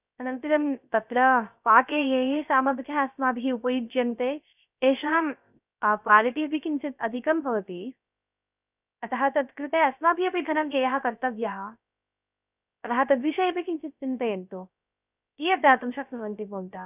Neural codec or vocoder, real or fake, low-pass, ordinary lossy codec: codec, 16 kHz, 0.3 kbps, FocalCodec; fake; 3.6 kHz; none